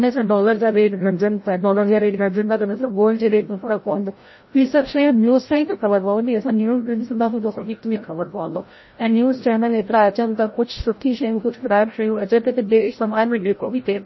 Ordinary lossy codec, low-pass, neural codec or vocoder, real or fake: MP3, 24 kbps; 7.2 kHz; codec, 16 kHz, 0.5 kbps, FreqCodec, larger model; fake